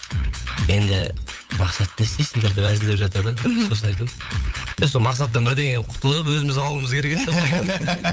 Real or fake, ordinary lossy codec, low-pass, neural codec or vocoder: fake; none; none; codec, 16 kHz, 8 kbps, FunCodec, trained on LibriTTS, 25 frames a second